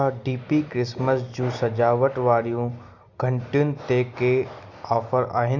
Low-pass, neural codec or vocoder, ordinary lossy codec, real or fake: 7.2 kHz; none; Opus, 64 kbps; real